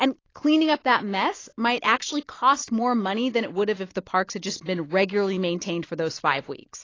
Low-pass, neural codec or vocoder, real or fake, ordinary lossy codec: 7.2 kHz; none; real; AAC, 32 kbps